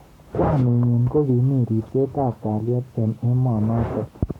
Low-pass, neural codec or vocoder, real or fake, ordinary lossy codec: 19.8 kHz; codec, 44.1 kHz, 7.8 kbps, Pupu-Codec; fake; none